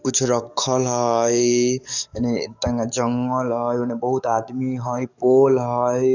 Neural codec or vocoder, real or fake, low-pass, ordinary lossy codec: codec, 44.1 kHz, 7.8 kbps, DAC; fake; 7.2 kHz; none